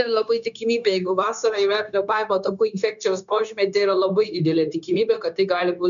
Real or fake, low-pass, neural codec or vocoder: fake; 7.2 kHz; codec, 16 kHz, 0.9 kbps, LongCat-Audio-Codec